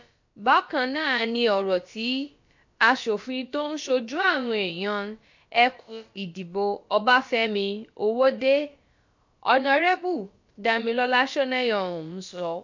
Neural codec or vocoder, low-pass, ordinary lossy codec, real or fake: codec, 16 kHz, about 1 kbps, DyCAST, with the encoder's durations; 7.2 kHz; MP3, 48 kbps; fake